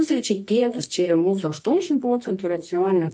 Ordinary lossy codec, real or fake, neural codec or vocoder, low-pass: MP3, 48 kbps; fake; codec, 24 kHz, 0.9 kbps, WavTokenizer, medium music audio release; 9.9 kHz